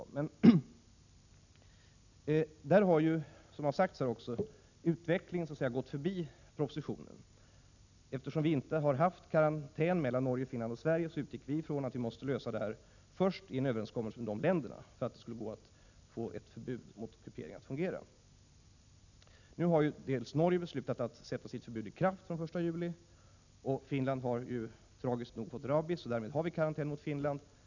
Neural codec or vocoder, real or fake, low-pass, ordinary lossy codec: none; real; 7.2 kHz; none